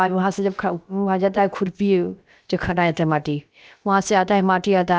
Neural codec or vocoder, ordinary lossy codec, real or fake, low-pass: codec, 16 kHz, about 1 kbps, DyCAST, with the encoder's durations; none; fake; none